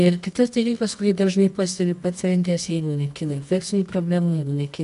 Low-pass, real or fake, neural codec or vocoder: 10.8 kHz; fake; codec, 24 kHz, 0.9 kbps, WavTokenizer, medium music audio release